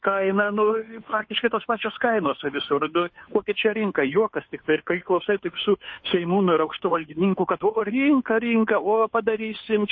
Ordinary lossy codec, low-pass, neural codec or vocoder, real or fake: MP3, 32 kbps; 7.2 kHz; codec, 16 kHz, 2 kbps, FunCodec, trained on Chinese and English, 25 frames a second; fake